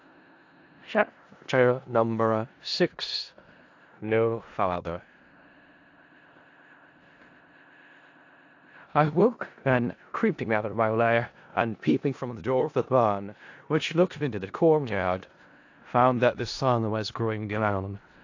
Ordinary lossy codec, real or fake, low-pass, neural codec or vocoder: AAC, 48 kbps; fake; 7.2 kHz; codec, 16 kHz in and 24 kHz out, 0.4 kbps, LongCat-Audio-Codec, four codebook decoder